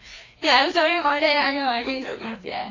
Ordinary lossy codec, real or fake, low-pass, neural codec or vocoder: AAC, 32 kbps; fake; 7.2 kHz; codec, 16 kHz, 1 kbps, FreqCodec, larger model